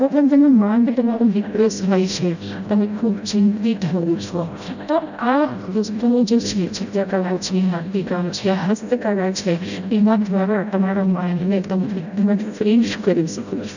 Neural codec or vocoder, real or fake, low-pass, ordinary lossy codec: codec, 16 kHz, 0.5 kbps, FreqCodec, smaller model; fake; 7.2 kHz; none